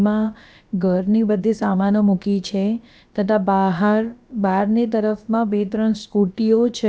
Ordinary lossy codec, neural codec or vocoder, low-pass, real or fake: none; codec, 16 kHz, about 1 kbps, DyCAST, with the encoder's durations; none; fake